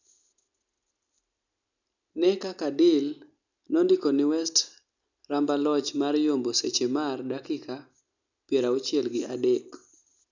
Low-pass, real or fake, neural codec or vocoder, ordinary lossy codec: 7.2 kHz; real; none; none